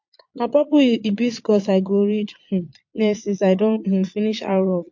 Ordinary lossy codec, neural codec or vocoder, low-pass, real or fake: MP3, 48 kbps; vocoder, 22.05 kHz, 80 mel bands, Vocos; 7.2 kHz; fake